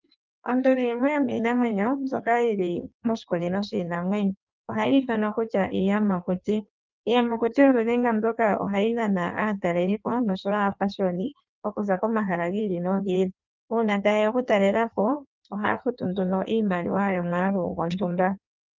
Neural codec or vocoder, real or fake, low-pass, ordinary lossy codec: codec, 16 kHz in and 24 kHz out, 1.1 kbps, FireRedTTS-2 codec; fake; 7.2 kHz; Opus, 24 kbps